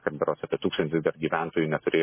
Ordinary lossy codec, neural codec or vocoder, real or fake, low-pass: MP3, 16 kbps; none; real; 3.6 kHz